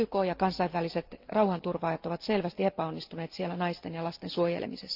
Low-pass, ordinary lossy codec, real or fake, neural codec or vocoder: 5.4 kHz; Opus, 32 kbps; real; none